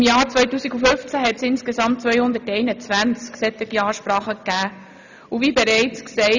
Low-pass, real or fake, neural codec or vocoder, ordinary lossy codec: 7.2 kHz; real; none; none